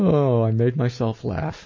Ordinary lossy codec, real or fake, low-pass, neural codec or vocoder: MP3, 32 kbps; real; 7.2 kHz; none